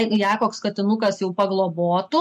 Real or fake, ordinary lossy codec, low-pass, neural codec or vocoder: real; MP3, 64 kbps; 14.4 kHz; none